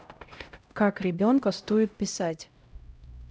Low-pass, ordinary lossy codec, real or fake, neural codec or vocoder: none; none; fake; codec, 16 kHz, 0.5 kbps, X-Codec, HuBERT features, trained on LibriSpeech